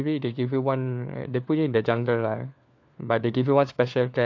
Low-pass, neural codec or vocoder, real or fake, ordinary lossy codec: 7.2 kHz; codec, 16 kHz, 4 kbps, FunCodec, trained on LibriTTS, 50 frames a second; fake; AAC, 48 kbps